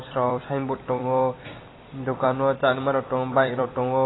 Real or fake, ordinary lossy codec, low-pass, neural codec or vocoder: fake; AAC, 16 kbps; 7.2 kHz; vocoder, 44.1 kHz, 128 mel bands every 256 samples, BigVGAN v2